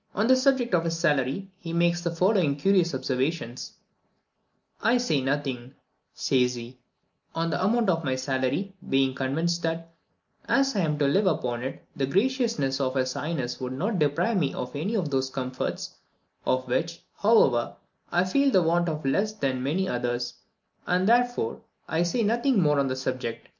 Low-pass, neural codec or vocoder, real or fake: 7.2 kHz; none; real